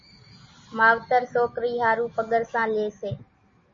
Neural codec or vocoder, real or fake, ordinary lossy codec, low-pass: none; real; MP3, 32 kbps; 7.2 kHz